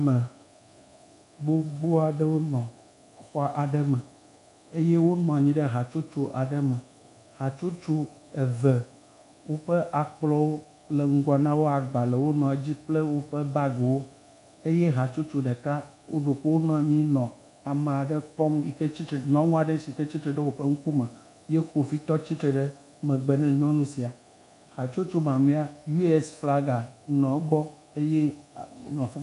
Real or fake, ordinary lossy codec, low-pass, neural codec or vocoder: fake; MP3, 96 kbps; 10.8 kHz; codec, 24 kHz, 1.2 kbps, DualCodec